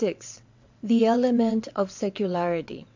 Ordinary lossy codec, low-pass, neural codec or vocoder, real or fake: MP3, 64 kbps; 7.2 kHz; vocoder, 22.05 kHz, 80 mel bands, WaveNeXt; fake